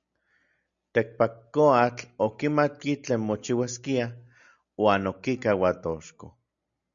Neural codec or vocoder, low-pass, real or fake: none; 7.2 kHz; real